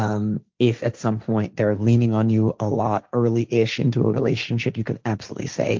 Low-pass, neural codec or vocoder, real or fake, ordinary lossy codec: 7.2 kHz; codec, 16 kHz, 1.1 kbps, Voila-Tokenizer; fake; Opus, 24 kbps